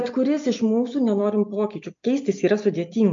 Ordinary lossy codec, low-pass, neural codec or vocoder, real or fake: MP3, 48 kbps; 7.2 kHz; none; real